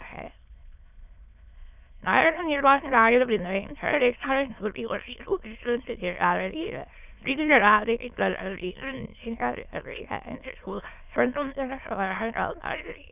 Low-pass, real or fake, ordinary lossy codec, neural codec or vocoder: 3.6 kHz; fake; none; autoencoder, 22.05 kHz, a latent of 192 numbers a frame, VITS, trained on many speakers